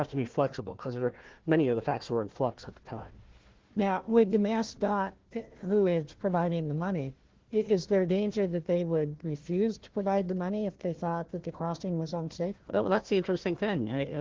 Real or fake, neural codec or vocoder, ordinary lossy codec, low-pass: fake; codec, 16 kHz, 1 kbps, FunCodec, trained on Chinese and English, 50 frames a second; Opus, 16 kbps; 7.2 kHz